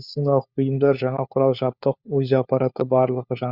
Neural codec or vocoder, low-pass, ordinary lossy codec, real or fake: codec, 16 kHz, 4 kbps, FunCodec, trained on LibriTTS, 50 frames a second; 5.4 kHz; Opus, 64 kbps; fake